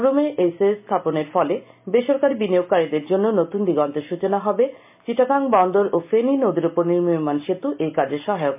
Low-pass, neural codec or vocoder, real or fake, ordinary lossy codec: 3.6 kHz; none; real; MP3, 32 kbps